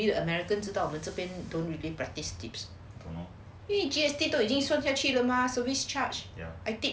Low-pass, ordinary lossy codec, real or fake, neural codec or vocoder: none; none; real; none